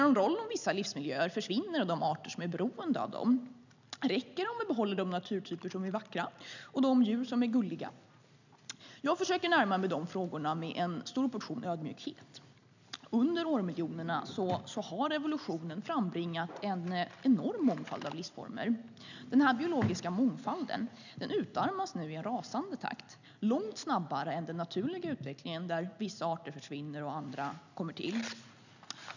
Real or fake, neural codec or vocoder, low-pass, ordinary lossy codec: real; none; 7.2 kHz; none